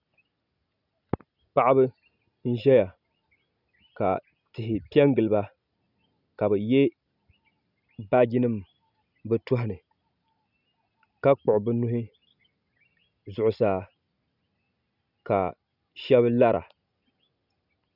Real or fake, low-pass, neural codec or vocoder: real; 5.4 kHz; none